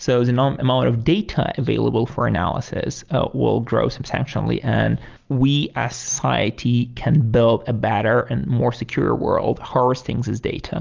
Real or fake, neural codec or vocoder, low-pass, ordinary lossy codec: real; none; 7.2 kHz; Opus, 24 kbps